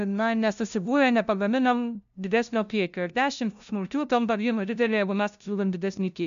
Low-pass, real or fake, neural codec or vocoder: 7.2 kHz; fake; codec, 16 kHz, 0.5 kbps, FunCodec, trained on LibriTTS, 25 frames a second